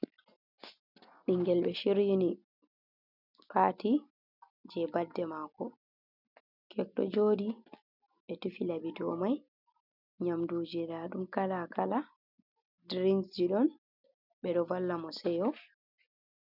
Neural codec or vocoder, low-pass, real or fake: none; 5.4 kHz; real